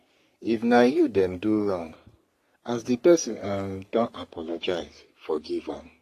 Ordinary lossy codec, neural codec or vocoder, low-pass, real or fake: AAC, 48 kbps; codec, 44.1 kHz, 3.4 kbps, Pupu-Codec; 14.4 kHz; fake